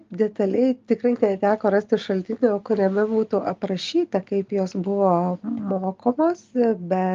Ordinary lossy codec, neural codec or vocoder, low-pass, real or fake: Opus, 32 kbps; none; 7.2 kHz; real